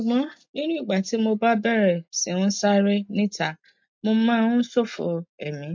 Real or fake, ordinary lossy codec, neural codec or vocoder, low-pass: real; MP3, 48 kbps; none; 7.2 kHz